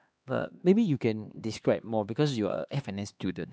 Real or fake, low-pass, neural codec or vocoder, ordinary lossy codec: fake; none; codec, 16 kHz, 2 kbps, X-Codec, HuBERT features, trained on LibriSpeech; none